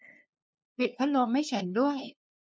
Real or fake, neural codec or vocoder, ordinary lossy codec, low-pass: fake; codec, 16 kHz, 2 kbps, FunCodec, trained on LibriTTS, 25 frames a second; none; none